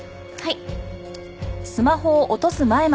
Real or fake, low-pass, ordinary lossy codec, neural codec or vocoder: real; none; none; none